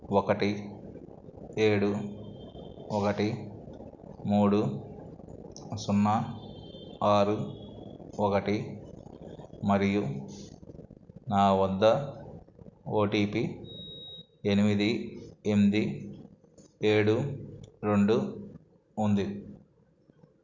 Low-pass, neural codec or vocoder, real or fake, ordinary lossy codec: 7.2 kHz; none; real; none